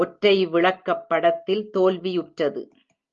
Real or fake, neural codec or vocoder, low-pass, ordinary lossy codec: real; none; 7.2 kHz; Opus, 32 kbps